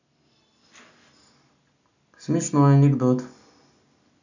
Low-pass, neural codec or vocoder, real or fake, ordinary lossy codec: 7.2 kHz; none; real; none